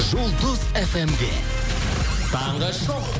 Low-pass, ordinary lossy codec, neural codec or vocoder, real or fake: none; none; none; real